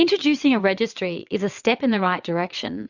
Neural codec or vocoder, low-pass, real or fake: vocoder, 22.05 kHz, 80 mel bands, WaveNeXt; 7.2 kHz; fake